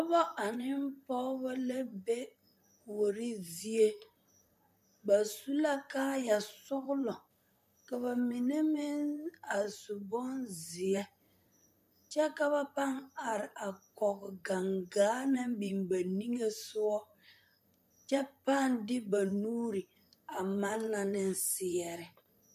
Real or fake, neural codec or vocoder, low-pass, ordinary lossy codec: fake; vocoder, 44.1 kHz, 128 mel bands, Pupu-Vocoder; 14.4 kHz; MP3, 96 kbps